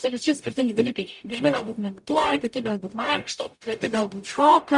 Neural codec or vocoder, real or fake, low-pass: codec, 44.1 kHz, 0.9 kbps, DAC; fake; 10.8 kHz